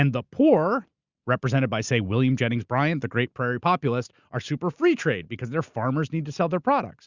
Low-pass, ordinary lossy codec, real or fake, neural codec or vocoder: 7.2 kHz; Opus, 64 kbps; real; none